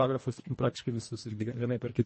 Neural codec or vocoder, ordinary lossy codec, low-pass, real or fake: codec, 24 kHz, 1.5 kbps, HILCodec; MP3, 32 kbps; 10.8 kHz; fake